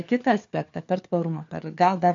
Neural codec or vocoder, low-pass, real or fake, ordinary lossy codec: codec, 16 kHz, 4 kbps, FunCodec, trained on LibriTTS, 50 frames a second; 7.2 kHz; fake; AAC, 48 kbps